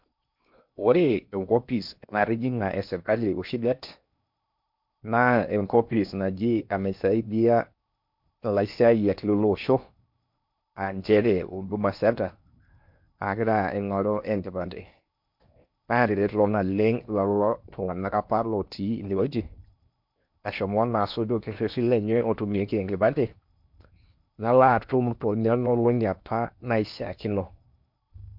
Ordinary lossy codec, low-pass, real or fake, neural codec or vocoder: MP3, 48 kbps; 5.4 kHz; fake; codec, 16 kHz in and 24 kHz out, 0.8 kbps, FocalCodec, streaming, 65536 codes